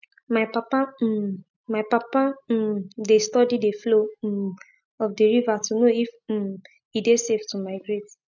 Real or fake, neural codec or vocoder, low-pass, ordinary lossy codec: real; none; 7.2 kHz; none